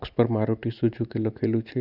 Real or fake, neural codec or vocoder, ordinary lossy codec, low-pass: real; none; none; 5.4 kHz